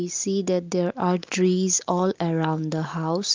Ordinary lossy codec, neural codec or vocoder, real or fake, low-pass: Opus, 24 kbps; none; real; 7.2 kHz